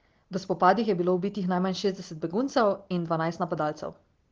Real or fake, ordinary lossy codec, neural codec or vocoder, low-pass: real; Opus, 16 kbps; none; 7.2 kHz